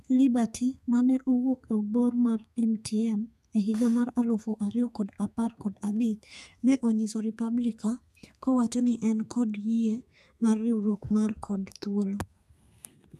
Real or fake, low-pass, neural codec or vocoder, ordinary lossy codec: fake; 14.4 kHz; codec, 32 kHz, 1.9 kbps, SNAC; none